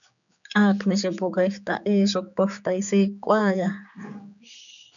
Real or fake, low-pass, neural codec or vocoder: fake; 7.2 kHz; codec, 16 kHz, 4 kbps, X-Codec, HuBERT features, trained on general audio